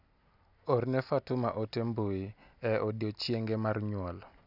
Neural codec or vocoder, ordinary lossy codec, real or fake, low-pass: none; none; real; 5.4 kHz